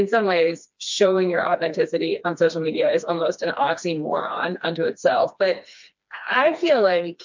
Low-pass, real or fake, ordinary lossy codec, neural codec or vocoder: 7.2 kHz; fake; MP3, 64 kbps; codec, 16 kHz, 2 kbps, FreqCodec, smaller model